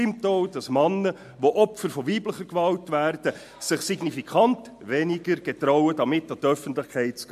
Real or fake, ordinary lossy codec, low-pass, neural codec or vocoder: real; MP3, 64 kbps; 14.4 kHz; none